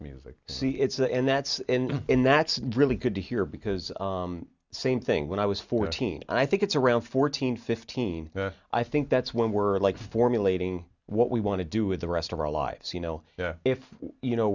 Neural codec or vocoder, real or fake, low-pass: none; real; 7.2 kHz